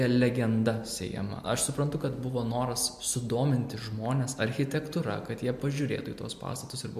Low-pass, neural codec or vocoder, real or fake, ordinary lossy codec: 14.4 kHz; none; real; MP3, 64 kbps